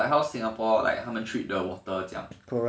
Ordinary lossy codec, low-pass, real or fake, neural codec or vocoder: none; none; real; none